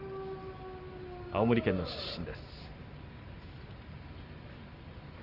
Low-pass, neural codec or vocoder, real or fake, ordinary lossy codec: 5.4 kHz; none; real; Opus, 32 kbps